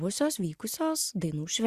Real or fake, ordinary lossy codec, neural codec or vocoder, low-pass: real; Opus, 64 kbps; none; 14.4 kHz